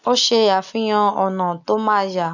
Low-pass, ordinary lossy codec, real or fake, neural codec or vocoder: 7.2 kHz; none; real; none